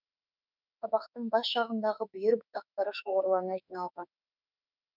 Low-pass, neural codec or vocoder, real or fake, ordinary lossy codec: 5.4 kHz; autoencoder, 48 kHz, 32 numbers a frame, DAC-VAE, trained on Japanese speech; fake; none